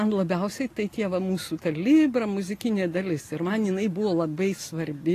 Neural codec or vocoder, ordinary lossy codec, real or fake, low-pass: none; AAC, 48 kbps; real; 14.4 kHz